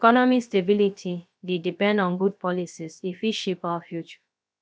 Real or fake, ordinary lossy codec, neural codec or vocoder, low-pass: fake; none; codec, 16 kHz, about 1 kbps, DyCAST, with the encoder's durations; none